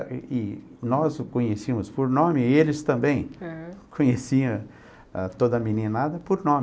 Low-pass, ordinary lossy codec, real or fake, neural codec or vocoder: none; none; real; none